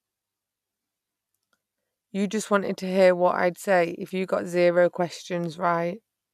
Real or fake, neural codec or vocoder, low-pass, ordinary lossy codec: real; none; 14.4 kHz; none